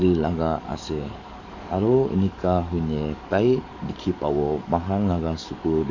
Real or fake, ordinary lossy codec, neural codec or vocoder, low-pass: fake; none; codec, 44.1 kHz, 7.8 kbps, DAC; 7.2 kHz